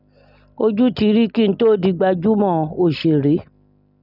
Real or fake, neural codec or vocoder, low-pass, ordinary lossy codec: real; none; 5.4 kHz; none